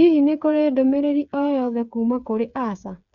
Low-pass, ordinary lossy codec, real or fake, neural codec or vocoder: 7.2 kHz; none; fake; codec, 16 kHz, 8 kbps, FreqCodec, smaller model